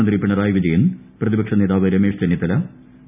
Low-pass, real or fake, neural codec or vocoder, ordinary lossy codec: 3.6 kHz; real; none; none